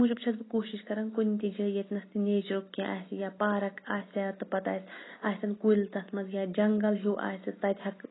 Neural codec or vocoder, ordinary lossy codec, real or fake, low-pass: none; AAC, 16 kbps; real; 7.2 kHz